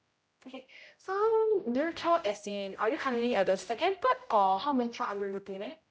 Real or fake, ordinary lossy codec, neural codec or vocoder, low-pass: fake; none; codec, 16 kHz, 0.5 kbps, X-Codec, HuBERT features, trained on general audio; none